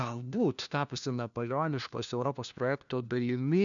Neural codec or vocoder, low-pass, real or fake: codec, 16 kHz, 1 kbps, FunCodec, trained on LibriTTS, 50 frames a second; 7.2 kHz; fake